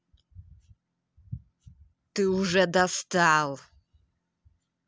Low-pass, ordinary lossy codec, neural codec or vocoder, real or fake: none; none; none; real